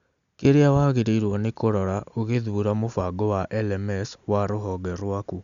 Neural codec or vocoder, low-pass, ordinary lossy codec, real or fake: none; 7.2 kHz; Opus, 64 kbps; real